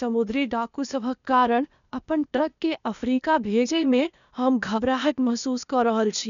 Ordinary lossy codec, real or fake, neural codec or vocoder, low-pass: none; fake; codec, 16 kHz, 0.8 kbps, ZipCodec; 7.2 kHz